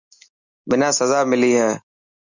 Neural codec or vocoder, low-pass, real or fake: none; 7.2 kHz; real